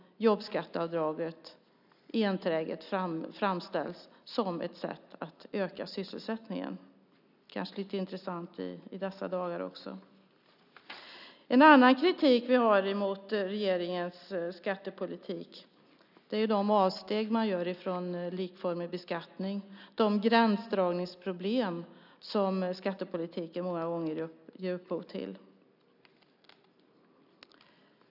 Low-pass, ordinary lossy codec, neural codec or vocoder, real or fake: 5.4 kHz; none; none; real